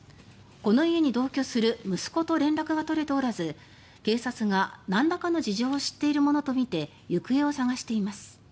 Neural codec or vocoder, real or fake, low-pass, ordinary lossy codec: none; real; none; none